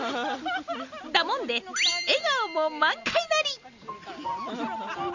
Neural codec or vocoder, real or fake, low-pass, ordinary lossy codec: none; real; 7.2 kHz; Opus, 64 kbps